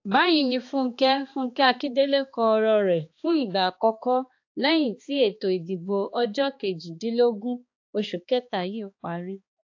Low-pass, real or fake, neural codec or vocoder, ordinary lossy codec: 7.2 kHz; fake; codec, 16 kHz, 2 kbps, X-Codec, HuBERT features, trained on balanced general audio; AAC, 48 kbps